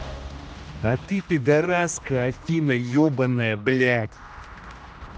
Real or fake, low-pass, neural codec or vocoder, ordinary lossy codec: fake; none; codec, 16 kHz, 1 kbps, X-Codec, HuBERT features, trained on general audio; none